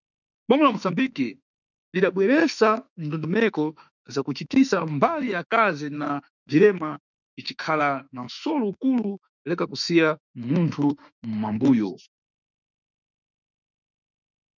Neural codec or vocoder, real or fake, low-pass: autoencoder, 48 kHz, 32 numbers a frame, DAC-VAE, trained on Japanese speech; fake; 7.2 kHz